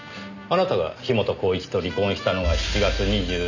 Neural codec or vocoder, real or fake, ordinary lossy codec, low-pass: none; real; none; 7.2 kHz